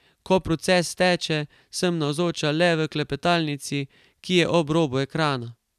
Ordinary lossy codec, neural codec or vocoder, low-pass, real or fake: none; none; 14.4 kHz; real